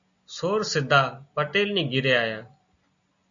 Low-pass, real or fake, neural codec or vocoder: 7.2 kHz; real; none